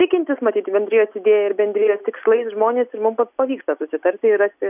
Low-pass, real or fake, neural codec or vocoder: 3.6 kHz; real; none